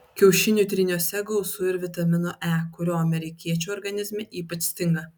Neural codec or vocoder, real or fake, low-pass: none; real; 19.8 kHz